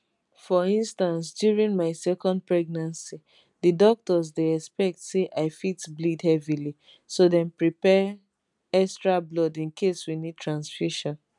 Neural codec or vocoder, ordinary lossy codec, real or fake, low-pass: none; none; real; 10.8 kHz